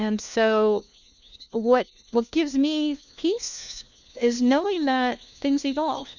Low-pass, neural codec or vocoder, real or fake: 7.2 kHz; codec, 16 kHz, 1 kbps, FunCodec, trained on LibriTTS, 50 frames a second; fake